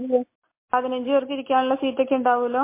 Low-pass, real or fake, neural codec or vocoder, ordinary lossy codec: 3.6 kHz; real; none; MP3, 24 kbps